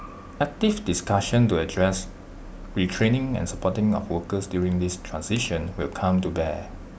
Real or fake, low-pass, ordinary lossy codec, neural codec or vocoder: real; none; none; none